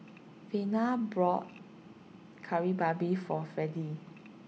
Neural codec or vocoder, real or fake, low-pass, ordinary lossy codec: none; real; none; none